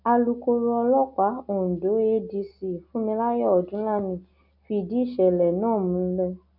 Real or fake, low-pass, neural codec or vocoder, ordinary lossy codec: real; 5.4 kHz; none; none